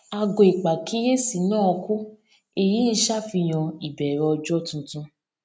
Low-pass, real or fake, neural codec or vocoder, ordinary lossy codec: none; real; none; none